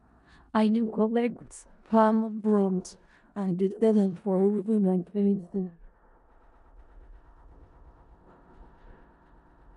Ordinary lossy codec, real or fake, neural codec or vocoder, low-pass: none; fake; codec, 16 kHz in and 24 kHz out, 0.4 kbps, LongCat-Audio-Codec, four codebook decoder; 10.8 kHz